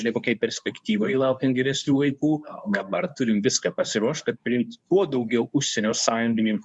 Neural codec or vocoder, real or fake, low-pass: codec, 24 kHz, 0.9 kbps, WavTokenizer, medium speech release version 2; fake; 10.8 kHz